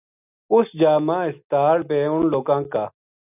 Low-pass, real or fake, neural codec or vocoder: 3.6 kHz; real; none